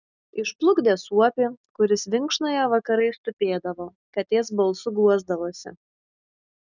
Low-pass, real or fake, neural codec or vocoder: 7.2 kHz; real; none